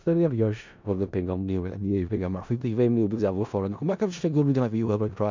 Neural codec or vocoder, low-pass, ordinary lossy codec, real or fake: codec, 16 kHz in and 24 kHz out, 0.4 kbps, LongCat-Audio-Codec, four codebook decoder; 7.2 kHz; MP3, 64 kbps; fake